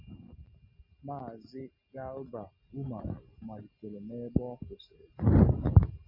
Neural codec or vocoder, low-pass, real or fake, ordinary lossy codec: none; 5.4 kHz; real; MP3, 32 kbps